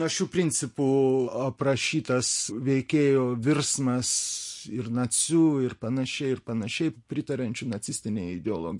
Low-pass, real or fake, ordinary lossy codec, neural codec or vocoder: 10.8 kHz; real; MP3, 48 kbps; none